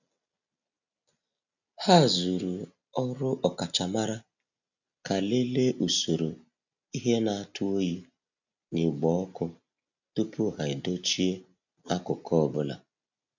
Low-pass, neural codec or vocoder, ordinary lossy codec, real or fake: 7.2 kHz; none; none; real